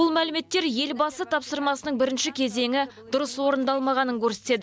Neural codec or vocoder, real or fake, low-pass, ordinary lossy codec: none; real; none; none